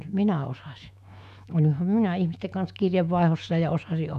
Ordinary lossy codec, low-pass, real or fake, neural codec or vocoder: none; 14.4 kHz; fake; autoencoder, 48 kHz, 128 numbers a frame, DAC-VAE, trained on Japanese speech